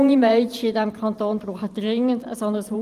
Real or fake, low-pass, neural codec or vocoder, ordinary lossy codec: fake; 14.4 kHz; vocoder, 44.1 kHz, 128 mel bands every 512 samples, BigVGAN v2; Opus, 24 kbps